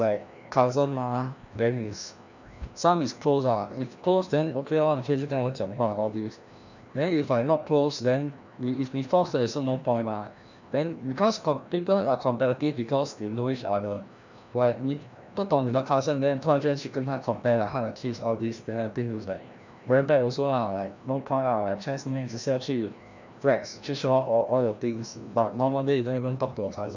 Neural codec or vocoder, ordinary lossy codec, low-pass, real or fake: codec, 16 kHz, 1 kbps, FreqCodec, larger model; none; 7.2 kHz; fake